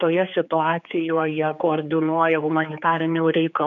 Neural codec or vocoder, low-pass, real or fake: codec, 16 kHz, 4 kbps, X-Codec, HuBERT features, trained on general audio; 7.2 kHz; fake